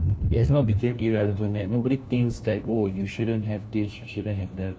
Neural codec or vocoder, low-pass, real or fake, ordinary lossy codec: codec, 16 kHz, 1 kbps, FunCodec, trained on LibriTTS, 50 frames a second; none; fake; none